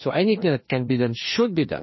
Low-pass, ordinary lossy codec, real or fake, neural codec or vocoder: 7.2 kHz; MP3, 24 kbps; fake; codec, 16 kHz, 2 kbps, FreqCodec, larger model